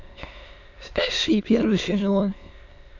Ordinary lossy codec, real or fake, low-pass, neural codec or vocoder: none; fake; 7.2 kHz; autoencoder, 22.05 kHz, a latent of 192 numbers a frame, VITS, trained on many speakers